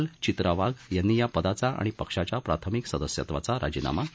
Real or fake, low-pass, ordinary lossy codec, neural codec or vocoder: real; none; none; none